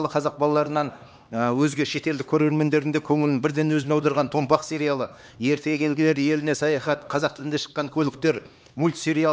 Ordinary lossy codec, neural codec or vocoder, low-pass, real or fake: none; codec, 16 kHz, 2 kbps, X-Codec, HuBERT features, trained on LibriSpeech; none; fake